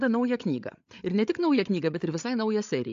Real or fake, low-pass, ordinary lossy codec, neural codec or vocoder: fake; 7.2 kHz; AAC, 64 kbps; codec, 16 kHz, 8 kbps, FreqCodec, larger model